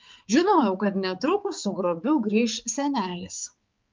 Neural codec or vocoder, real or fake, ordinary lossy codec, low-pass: codec, 16 kHz, 4 kbps, X-Codec, HuBERT features, trained on balanced general audio; fake; Opus, 24 kbps; 7.2 kHz